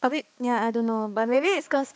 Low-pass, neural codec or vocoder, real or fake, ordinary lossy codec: none; codec, 16 kHz, 2 kbps, X-Codec, HuBERT features, trained on balanced general audio; fake; none